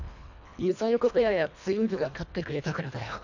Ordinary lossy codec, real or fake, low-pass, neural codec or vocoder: none; fake; 7.2 kHz; codec, 24 kHz, 1.5 kbps, HILCodec